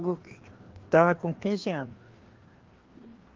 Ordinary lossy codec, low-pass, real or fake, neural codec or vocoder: Opus, 16 kbps; 7.2 kHz; fake; codec, 16 kHz, 2 kbps, FreqCodec, larger model